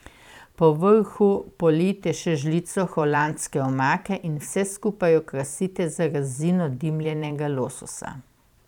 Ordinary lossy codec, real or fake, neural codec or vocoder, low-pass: none; fake; vocoder, 44.1 kHz, 128 mel bands every 512 samples, BigVGAN v2; 19.8 kHz